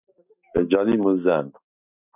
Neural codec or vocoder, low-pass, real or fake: none; 3.6 kHz; real